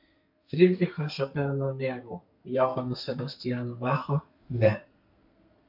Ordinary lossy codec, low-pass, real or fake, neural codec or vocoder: MP3, 48 kbps; 5.4 kHz; fake; codec, 32 kHz, 1.9 kbps, SNAC